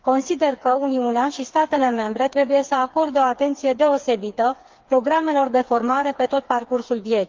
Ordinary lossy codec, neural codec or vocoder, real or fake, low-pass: Opus, 24 kbps; codec, 16 kHz, 4 kbps, FreqCodec, smaller model; fake; 7.2 kHz